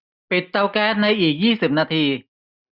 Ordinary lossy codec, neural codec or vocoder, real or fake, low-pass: none; none; real; 5.4 kHz